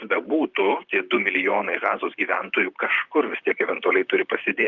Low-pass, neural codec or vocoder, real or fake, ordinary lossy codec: 7.2 kHz; vocoder, 44.1 kHz, 128 mel bands, Pupu-Vocoder; fake; Opus, 24 kbps